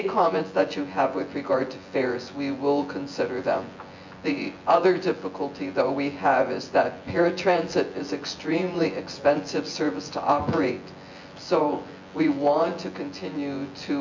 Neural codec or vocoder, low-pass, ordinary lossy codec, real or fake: vocoder, 24 kHz, 100 mel bands, Vocos; 7.2 kHz; MP3, 48 kbps; fake